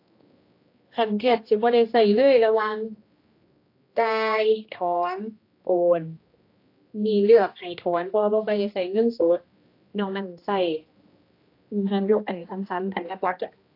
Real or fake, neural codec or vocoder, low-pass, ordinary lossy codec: fake; codec, 16 kHz, 1 kbps, X-Codec, HuBERT features, trained on general audio; 5.4 kHz; AAC, 32 kbps